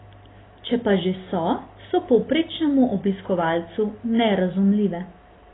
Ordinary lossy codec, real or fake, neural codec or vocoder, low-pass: AAC, 16 kbps; real; none; 7.2 kHz